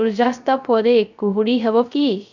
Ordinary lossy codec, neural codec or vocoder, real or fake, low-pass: none; codec, 16 kHz, 0.3 kbps, FocalCodec; fake; 7.2 kHz